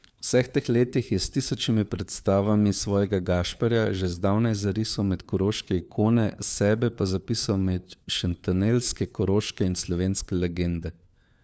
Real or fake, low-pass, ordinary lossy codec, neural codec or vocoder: fake; none; none; codec, 16 kHz, 4 kbps, FunCodec, trained on LibriTTS, 50 frames a second